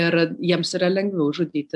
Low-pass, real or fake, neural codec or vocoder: 10.8 kHz; real; none